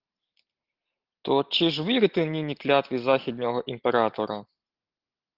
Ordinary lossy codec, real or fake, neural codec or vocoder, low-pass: Opus, 16 kbps; real; none; 5.4 kHz